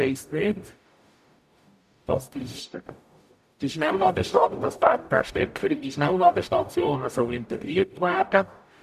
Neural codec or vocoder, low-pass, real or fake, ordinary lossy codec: codec, 44.1 kHz, 0.9 kbps, DAC; 14.4 kHz; fake; none